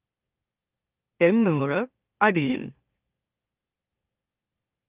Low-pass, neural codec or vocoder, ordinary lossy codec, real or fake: 3.6 kHz; autoencoder, 44.1 kHz, a latent of 192 numbers a frame, MeloTTS; Opus, 32 kbps; fake